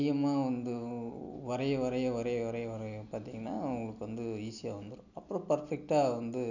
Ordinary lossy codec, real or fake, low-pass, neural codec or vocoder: none; real; 7.2 kHz; none